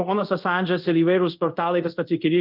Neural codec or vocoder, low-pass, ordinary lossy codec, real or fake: codec, 24 kHz, 0.5 kbps, DualCodec; 5.4 kHz; Opus, 32 kbps; fake